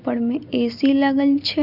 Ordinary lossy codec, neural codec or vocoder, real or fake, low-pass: Opus, 64 kbps; none; real; 5.4 kHz